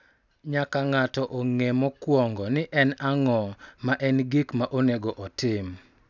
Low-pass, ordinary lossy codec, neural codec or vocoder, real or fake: 7.2 kHz; none; none; real